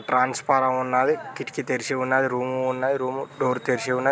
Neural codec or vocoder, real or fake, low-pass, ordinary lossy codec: none; real; none; none